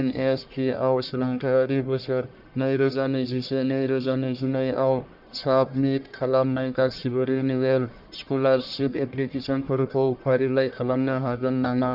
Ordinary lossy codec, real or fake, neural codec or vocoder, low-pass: none; fake; codec, 44.1 kHz, 1.7 kbps, Pupu-Codec; 5.4 kHz